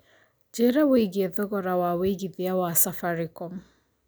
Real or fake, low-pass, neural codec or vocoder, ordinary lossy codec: real; none; none; none